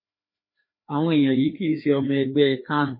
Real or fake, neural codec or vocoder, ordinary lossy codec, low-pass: fake; codec, 16 kHz, 2 kbps, FreqCodec, larger model; MP3, 24 kbps; 5.4 kHz